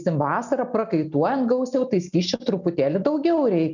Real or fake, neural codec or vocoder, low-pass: real; none; 7.2 kHz